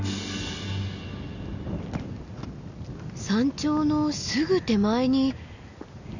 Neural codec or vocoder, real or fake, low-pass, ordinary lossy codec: none; real; 7.2 kHz; none